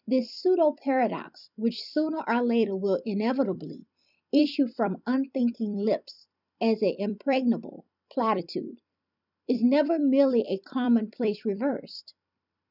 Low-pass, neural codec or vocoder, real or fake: 5.4 kHz; vocoder, 44.1 kHz, 128 mel bands every 256 samples, BigVGAN v2; fake